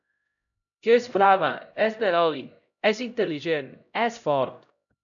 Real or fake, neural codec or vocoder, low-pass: fake; codec, 16 kHz, 0.5 kbps, X-Codec, HuBERT features, trained on LibriSpeech; 7.2 kHz